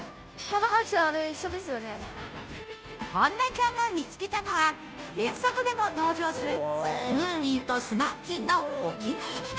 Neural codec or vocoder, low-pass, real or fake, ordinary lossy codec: codec, 16 kHz, 0.5 kbps, FunCodec, trained on Chinese and English, 25 frames a second; none; fake; none